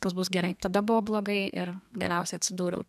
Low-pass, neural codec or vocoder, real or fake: 14.4 kHz; codec, 32 kHz, 1.9 kbps, SNAC; fake